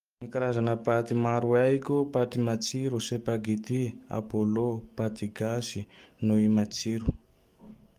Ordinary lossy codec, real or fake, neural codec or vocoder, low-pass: Opus, 32 kbps; fake; codec, 44.1 kHz, 7.8 kbps, DAC; 14.4 kHz